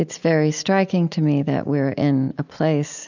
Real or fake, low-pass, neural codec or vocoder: real; 7.2 kHz; none